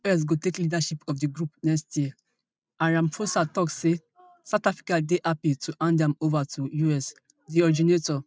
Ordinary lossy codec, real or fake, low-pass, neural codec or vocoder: none; real; none; none